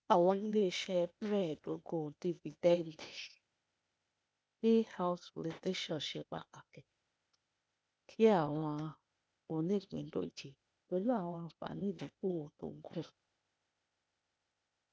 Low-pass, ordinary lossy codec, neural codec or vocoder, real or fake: none; none; codec, 16 kHz, 0.8 kbps, ZipCodec; fake